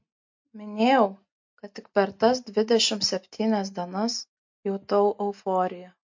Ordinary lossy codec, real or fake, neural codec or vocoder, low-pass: MP3, 48 kbps; real; none; 7.2 kHz